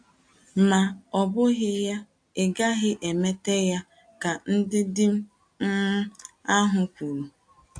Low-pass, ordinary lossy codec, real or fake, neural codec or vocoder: 9.9 kHz; AAC, 64 kbps; real; none